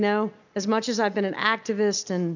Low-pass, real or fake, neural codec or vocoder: 7.2 kHz; real; none